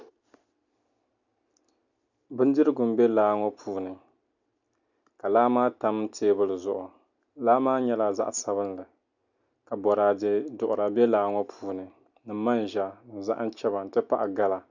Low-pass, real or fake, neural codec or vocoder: 7.2 kHz; real; none